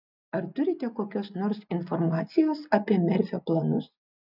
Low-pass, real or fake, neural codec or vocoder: 5.4 kHz; real; none